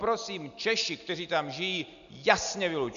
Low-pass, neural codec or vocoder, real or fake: 7.2 kHz; none; real